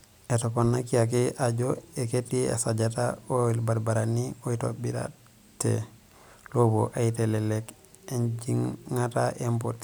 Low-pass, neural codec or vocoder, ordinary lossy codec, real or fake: none; none; none; real